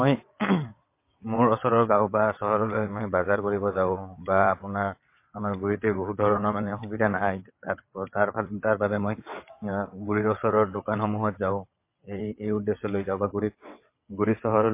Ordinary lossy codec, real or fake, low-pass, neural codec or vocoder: MP3, 24 kbps; fake; 3.6 kHz; vocoder, 22.05 kHz, 80 mel bands, WaveNeXt